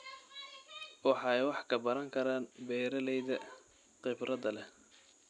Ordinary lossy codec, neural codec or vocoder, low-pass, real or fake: none; none; 10.8 kHz; real